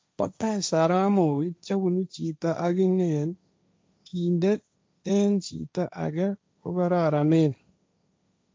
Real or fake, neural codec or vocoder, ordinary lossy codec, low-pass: fake; codec, 16 kHz, 1.1 kbps, Voila-Tokenizer; none; none